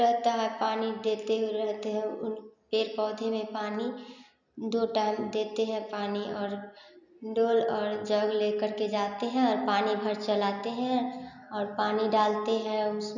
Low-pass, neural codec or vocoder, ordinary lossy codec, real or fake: 7.2 kHz; none; none; real